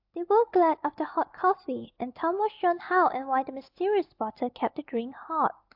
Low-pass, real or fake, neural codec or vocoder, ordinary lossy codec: 5.4 kHz; real; none; AAC, 48 kbps